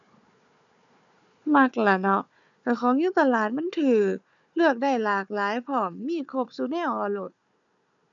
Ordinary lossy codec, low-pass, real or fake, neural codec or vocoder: none; 7.2 kHz; fake; codec, 16 kHz, 4 kbps, FunCodec, trained on Chinese and English, 50 frames a second